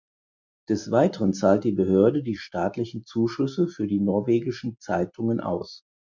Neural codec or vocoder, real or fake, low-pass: none; real; 7.2 kHz